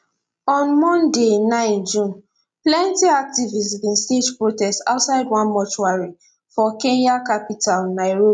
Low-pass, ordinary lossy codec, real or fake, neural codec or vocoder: 9.9 kHz; none; real; none